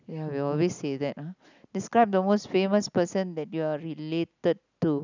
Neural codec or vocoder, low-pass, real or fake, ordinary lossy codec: none; 7.2 kHz; real; none